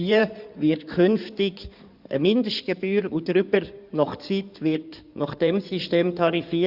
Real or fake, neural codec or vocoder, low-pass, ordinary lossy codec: fake; codec, 16 kHz in and 24 kHz out, 2.2 kbps, FireRedTTS-2 codec; 5.4 kHz; Opus, 64 kbps